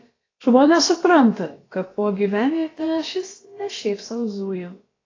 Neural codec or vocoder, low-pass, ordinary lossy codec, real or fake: codec, 16 kHz, about 1 kbps, DyCAST, with the encoder's durations; 7.2 kHz; AAC, 32 kbps; fake